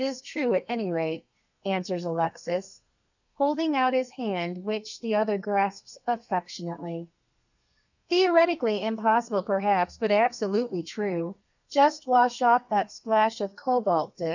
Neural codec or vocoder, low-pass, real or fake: codec, 32 kHz, 1.9 kbps, SNAC; 7.2 kHz; fake